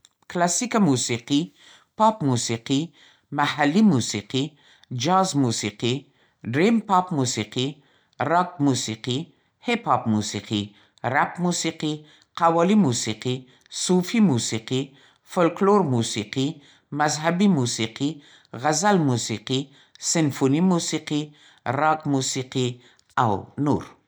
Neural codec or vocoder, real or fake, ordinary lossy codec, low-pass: none; real; none; none